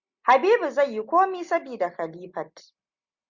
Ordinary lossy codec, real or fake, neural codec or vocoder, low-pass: Opus, 64 kbps; real; none; 7.2 kHz